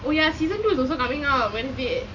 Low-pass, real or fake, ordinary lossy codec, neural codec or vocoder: 7.2 kHz; real; MP3, 48 kbps; none